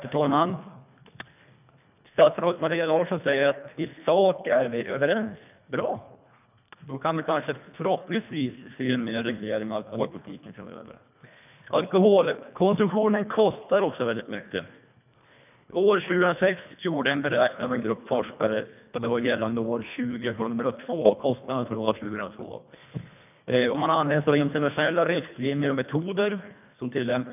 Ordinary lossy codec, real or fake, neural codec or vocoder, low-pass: none; fake; codec, 24 kHz, 1.5 kbps, HILCodec; 3.6 kHz